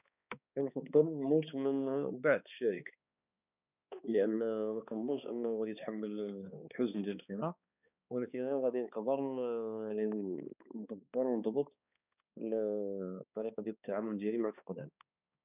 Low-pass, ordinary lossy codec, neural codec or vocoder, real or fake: 3.6 kHz; none; codec, 16 kHz, 2 kbps, X-Codec, HuBERT features, trained on balanced general audio; fake